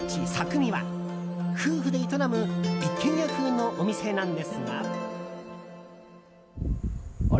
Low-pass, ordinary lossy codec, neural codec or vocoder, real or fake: none; none; none; real